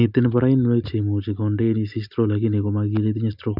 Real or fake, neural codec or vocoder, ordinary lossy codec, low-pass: real; none; none; 5.4 kHz